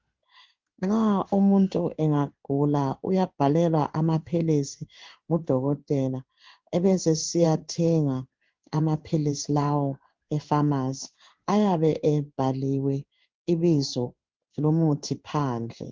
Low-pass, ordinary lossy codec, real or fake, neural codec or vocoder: 7.2 kHz; Opus, 32 kbps; fake; codec, 16 kHz in and 24 kHz out, 1 kbps, XY-Tokenizer